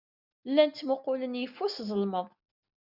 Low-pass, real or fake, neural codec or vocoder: 5.4 kHz; real; none